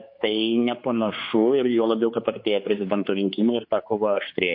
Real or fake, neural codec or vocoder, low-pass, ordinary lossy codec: fake; codec, 16 kHz, 4 kbps, X-Codec, HuBERT features, trained on general audio; 5.4 kHz; MP3, 32 kbps